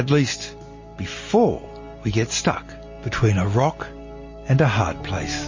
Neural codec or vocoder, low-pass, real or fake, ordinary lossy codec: none; 7.2 kHz; real; MP3, 32 kbps